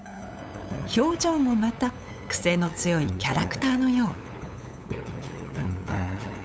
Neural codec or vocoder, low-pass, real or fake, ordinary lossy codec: codec, 16 kHz, 8 kbps, FunCodec, trained on LibriTTS, 25 frames a second; none; fake; none